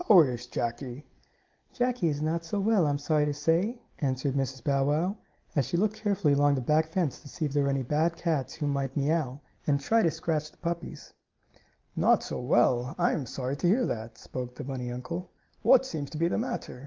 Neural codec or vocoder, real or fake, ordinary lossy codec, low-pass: none; real; Opus, 32 kbps; 7.2 kHz